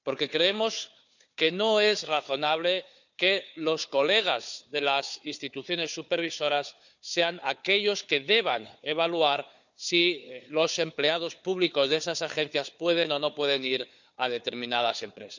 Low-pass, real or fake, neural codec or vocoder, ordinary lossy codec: 7.2 kHz; fake; codec, 16 kHz, 4 kbps, FunCodec, trained on Chinese and English, 50 frames a second; none